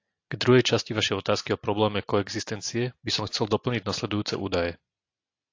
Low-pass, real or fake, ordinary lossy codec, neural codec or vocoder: 7.2 kHz; real; AAC, 48 kbps; none